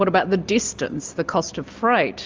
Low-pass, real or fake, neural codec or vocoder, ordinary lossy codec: 7.2 kHz; real; none; Opus, 32 kbps